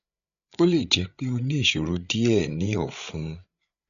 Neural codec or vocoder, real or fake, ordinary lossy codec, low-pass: codec, 16 kHz, 8 kbps, FreqCodec, larger model; fake; none; 7.2 kHz